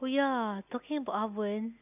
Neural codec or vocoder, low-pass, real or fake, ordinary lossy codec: none; 3.6 kHz; real; none